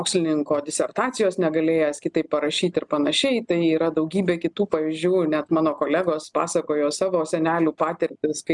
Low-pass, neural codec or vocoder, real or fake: 10.8 kHz; none; real